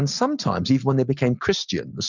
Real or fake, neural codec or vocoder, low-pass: real; none; 7.2 kHz